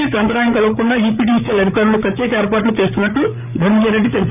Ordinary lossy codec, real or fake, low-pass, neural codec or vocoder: none; fake; 3.6 kHz; codec, 16 kHz, 8 kbps, FreqCodec, larger model